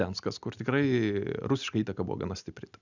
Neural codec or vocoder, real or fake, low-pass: none; real; 7.2 kHz